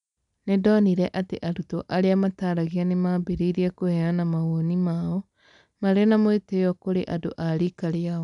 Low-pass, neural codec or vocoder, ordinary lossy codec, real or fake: 10.8 kHz; none; none; real